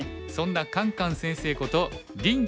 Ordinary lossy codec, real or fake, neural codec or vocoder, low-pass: none; real; none; none